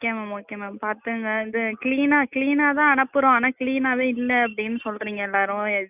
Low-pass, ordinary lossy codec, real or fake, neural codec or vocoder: 3.6 kHz; none; real; none